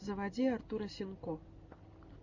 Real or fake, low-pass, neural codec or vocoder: real; 7.2 kHz; none